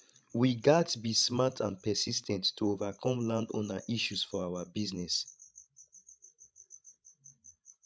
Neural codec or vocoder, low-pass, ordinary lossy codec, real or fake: codec, 16 kHz, 16 kbps, FreqCodec, larger model; none; none; fake